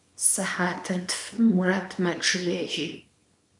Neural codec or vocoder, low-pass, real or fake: codec, 24 kHz, 0.9 kbps, WavTokenizer, small release; 10.8 kHz; fake